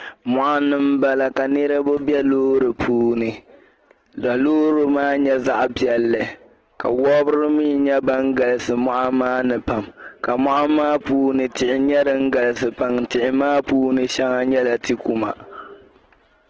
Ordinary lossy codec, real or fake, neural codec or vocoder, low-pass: Opus, 16 kbps; real; none; 7.2 kHz